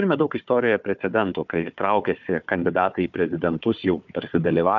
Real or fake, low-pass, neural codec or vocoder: fake; 7.2 kHz; codec, 16 kHz, 4 kbps, FunCodec, trained on Chinese and English, 50 frames a second